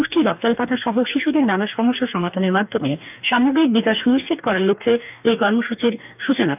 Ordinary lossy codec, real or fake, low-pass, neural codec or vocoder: none; fake; 3.6 kHz; codec, 44.1 kHz, 2.6 kbps, DAC